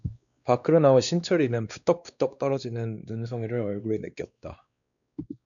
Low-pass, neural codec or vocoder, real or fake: 7.2 kHz; codec, 16 kHz, 2 kbps, X-Codec, WavLM features, trained on Multilingual LibriSpeech; fake